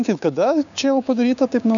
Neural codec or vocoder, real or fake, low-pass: codec, 16 kHz, 6 kbps, DAC; fake; 7.2 kHz